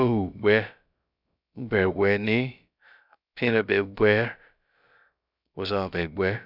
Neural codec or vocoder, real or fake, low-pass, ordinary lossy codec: codec, 16 kHz, about 1 kbps, DyCAST, with the encoder's durations; fake; 5.4 kHz; none